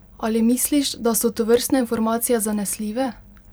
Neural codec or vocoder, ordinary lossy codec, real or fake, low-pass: vocoder, 44.1 kHz, 128 mel bands every 256 samples, BigVGAN v2; none; fake; none